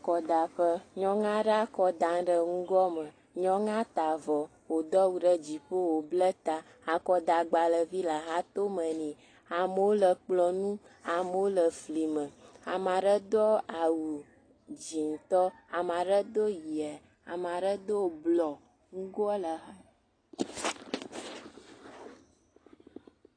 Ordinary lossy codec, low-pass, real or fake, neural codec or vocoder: AAC, 32 kbps; 9.9 kHz; real; none